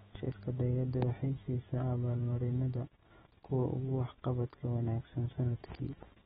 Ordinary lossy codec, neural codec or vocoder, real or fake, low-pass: AAC, 16 kbps; autoencoder, 48 kHz, 128 numbers a frame, DAC-VAE, trained on Japanese speech; fake; 19.8 kHz